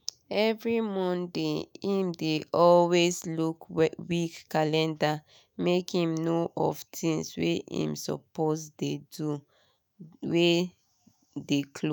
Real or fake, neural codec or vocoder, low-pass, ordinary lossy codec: fake; autoencoder, 48 kHz, 128 numbers a frame, DAC-VAE, trained on Japanese speech; none; none